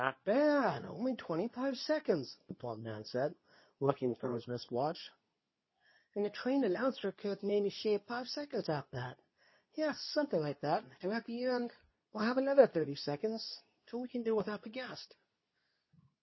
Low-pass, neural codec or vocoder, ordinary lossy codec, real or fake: 7.2 kHz; codec, 24 kHz, 0.9 kbps, WavTokenizer, medium speech release version 2; MP3, 24 kbps; fake